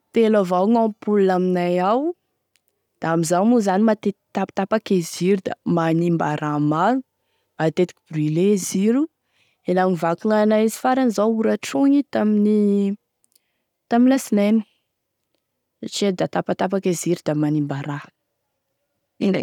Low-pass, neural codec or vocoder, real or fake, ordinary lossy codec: 19.8 kHz; none; real; none